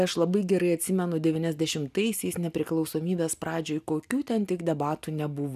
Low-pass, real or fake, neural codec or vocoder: 14.4 kHz; real; none